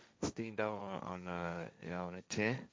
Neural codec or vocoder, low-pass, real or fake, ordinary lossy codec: codec, 16 kHz, 1.1 kbps, Voila-Tokenizer; none; fake; none